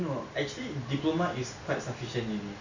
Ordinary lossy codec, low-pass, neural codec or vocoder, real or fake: none; 7.2 kHz; none; real